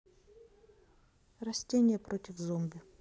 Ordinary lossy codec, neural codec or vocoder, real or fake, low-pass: none; none; real; none